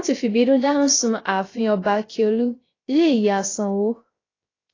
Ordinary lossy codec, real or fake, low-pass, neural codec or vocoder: AAC, 32 kbps; fake; 7.2 kHz; codec, 16 kHz, 0.3 kbps, FocalCodec